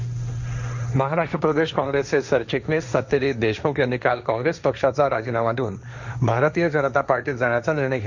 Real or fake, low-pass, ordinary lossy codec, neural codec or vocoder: fake; 7.2 kHz; none; codec, 16 kHz, 1.1 kbps, Voila-Tokenizer